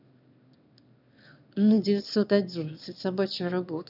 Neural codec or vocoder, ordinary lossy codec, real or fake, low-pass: autoencoder, 22.05 kHz, a latent of 192 numbers a frame, VITS, trained on one speaker; none; fake; 5.4 kHz